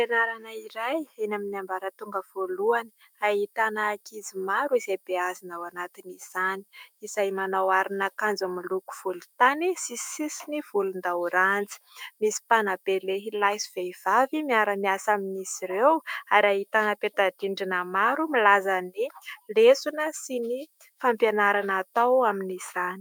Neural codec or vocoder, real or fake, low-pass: autoencoder, 48 kHz, 128 numbers a frame, DAC-VAE, trained on Japanese speech; fake; 19.8 kHz